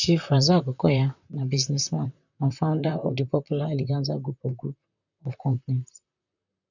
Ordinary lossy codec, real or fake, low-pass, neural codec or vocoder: none; fake; 7.2 kHz; vocoder, 44.1 kHz, 128 mel bands, Pupu-Vocoder